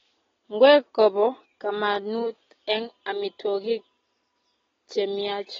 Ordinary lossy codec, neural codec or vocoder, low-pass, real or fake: AAC, 24 kbps; none; 7.2 kHz; real